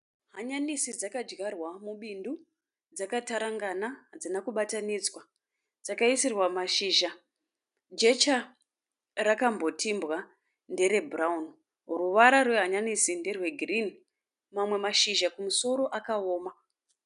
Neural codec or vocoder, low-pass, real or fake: none; 10.8 kHz; real